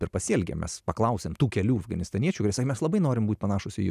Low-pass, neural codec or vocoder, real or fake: 14.4 kHz; none; real